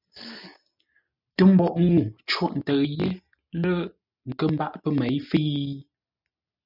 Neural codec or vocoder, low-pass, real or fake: none; 5.4 kHz; real